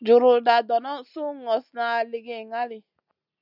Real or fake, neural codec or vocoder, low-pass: real; none; 5.4 kHz